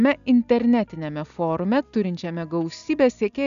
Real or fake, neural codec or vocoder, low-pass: real; none; 7.2 kHz